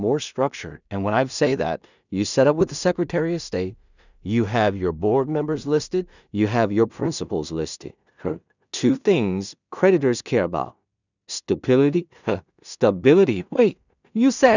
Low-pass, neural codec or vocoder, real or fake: 7.2 kHz; codec, 16 kHz in and 24 kHz out, 0.4 kbps, LongCat-Audio-Codec, two codebook decoder; fake